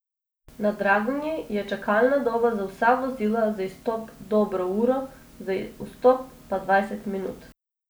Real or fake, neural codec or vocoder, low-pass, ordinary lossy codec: real; none; none; none